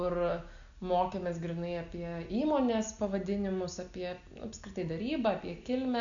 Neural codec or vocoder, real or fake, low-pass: none; real; 7.2 kHz